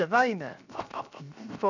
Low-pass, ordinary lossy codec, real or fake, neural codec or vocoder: 7.2 kHz; none; fake; codec, 16 kHz, 0.7 kbps, FocalCodec